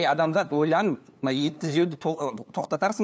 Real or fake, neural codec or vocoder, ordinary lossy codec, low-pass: fake; codec, 16 kHz, 2 kbps, FunCodec, trained on LibriTTS, 25 frames a second; none; none